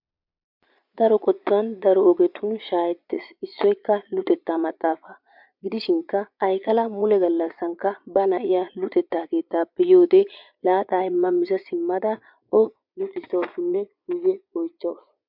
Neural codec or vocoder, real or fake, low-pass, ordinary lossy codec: vocoder, 22.05 kHz, 80 mel bands, Vocos; fake; 5.4 kHz; MP3, 48 kbps